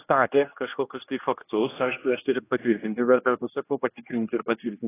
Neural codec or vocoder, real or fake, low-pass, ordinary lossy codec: codec, 16 kHz, 1 kbps, X-Codec, HuBERT features, trained on general audio; fake; 3.6 kHz; AAC, 16 kbps